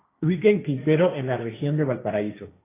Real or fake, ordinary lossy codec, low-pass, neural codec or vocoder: fake; AAC, 16 kbps; 3.6 kHz; codec, 16 kHz, 1.1 kbps, Voila-Tokenizer